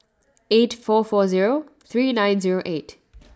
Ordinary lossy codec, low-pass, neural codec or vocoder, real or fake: none; none; none; real